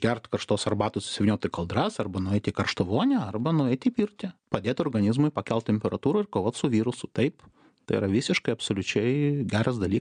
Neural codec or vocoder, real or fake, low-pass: none; real; 9.9 kHz